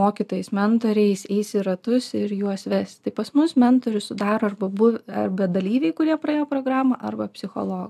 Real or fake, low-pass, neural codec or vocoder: real; 14.4 kHz; none